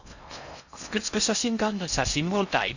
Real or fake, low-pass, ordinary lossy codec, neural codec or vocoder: fake; 7.2 kHz; none; codec, 16 kHz in and 24 kHz out, 0.6 kbps, FocalCodec, streaming, 4096 codes